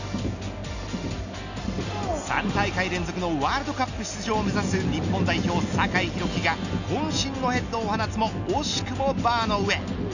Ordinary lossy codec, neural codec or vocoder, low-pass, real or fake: none; none; 7.2 kHz; real